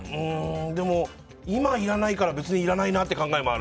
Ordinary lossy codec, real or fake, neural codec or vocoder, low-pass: none; real; none; none